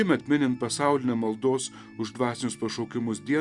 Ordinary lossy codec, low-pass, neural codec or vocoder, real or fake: Opus, 64 kbps; 10.8 kHz; none; real